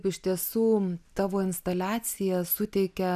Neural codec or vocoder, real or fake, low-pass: none; real; 14.4 kHz